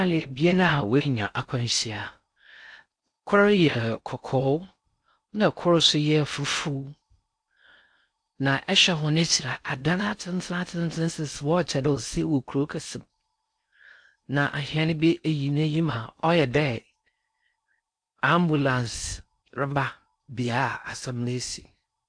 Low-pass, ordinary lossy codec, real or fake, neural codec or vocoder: 9.9 kHz; AAC, 64 kbps; fake; codec, 16 kHz in and 24 kHz out, 0.6 kbps, FocalCodec, streaming, 4096 codes